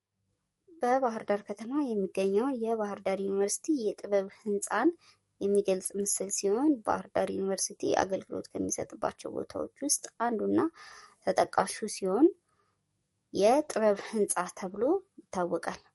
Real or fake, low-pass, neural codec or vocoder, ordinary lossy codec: fake; 19.8 kHz; codec, 44.1 kHz, 7.8 kbps, DAC; MP3, 48 kbps